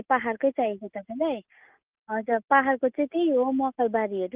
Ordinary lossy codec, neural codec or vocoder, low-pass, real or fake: Opus, 32 kbps; none; 3.6 kHz; real